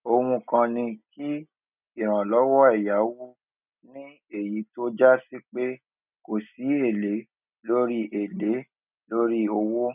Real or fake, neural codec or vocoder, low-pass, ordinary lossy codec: real; none; 3.6 kHz; none